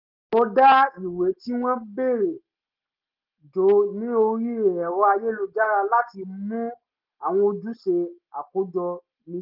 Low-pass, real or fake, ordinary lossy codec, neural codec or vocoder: 5.4 kHz; real; Opus, 32 kbps; none